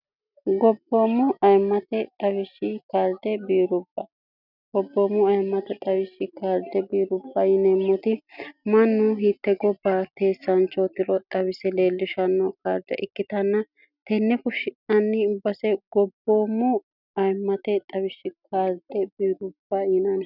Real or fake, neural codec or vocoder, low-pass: real; none; 5.4 kHz